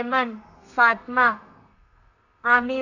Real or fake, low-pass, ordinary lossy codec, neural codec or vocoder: fake; 7.2 kHz; none; codec, 32 kHz, 1.9 kbps, SNAC